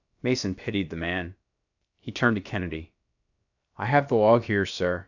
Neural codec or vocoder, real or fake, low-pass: codec, 16 kHz, about 1 kbps, DyCAST, with the encoder's durations; fake; 7.2 kHz